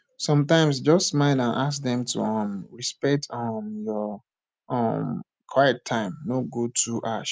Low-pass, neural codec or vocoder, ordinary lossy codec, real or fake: none; none; none; real